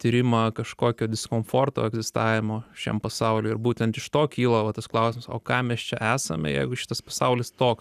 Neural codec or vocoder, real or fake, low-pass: none; real; 14.4 kHz